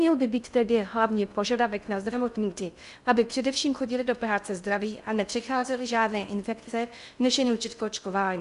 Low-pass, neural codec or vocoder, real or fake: 10.8 kHz; codec, 16 kHz in and 24 kHz out, 0.6 kbps, FocalCodec, streaming, 2048 codes; fake